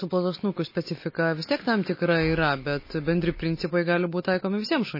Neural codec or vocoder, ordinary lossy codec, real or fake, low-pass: none; MP3, 24 kbps; real; 5.4 kHz